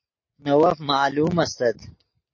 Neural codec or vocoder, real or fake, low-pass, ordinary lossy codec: none; real; 7.2 kHz; MP3, 32 kbps